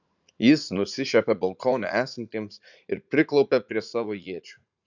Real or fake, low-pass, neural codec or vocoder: fake; 7.2 kHz; vocoder, 44.1 kHz, 80 mel bands, Vocos